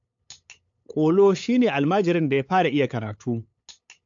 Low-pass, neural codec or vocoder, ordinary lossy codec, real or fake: 7.2 kHz; codec, 16 kHz, 8 kbps, FunCodec, trained on LibriTTS, 25 frames a second; AAC, 64 kbps; fake